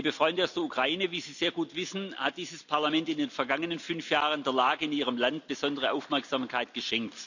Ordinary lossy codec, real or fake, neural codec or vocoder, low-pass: none; real; none; 7.2 kHz